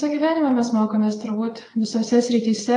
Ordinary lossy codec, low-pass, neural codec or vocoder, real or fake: AAC, 48 kbps; 9.9 kHz; vocoder, 22.05 kHz, 80 mel bands, WaveNeXt; fake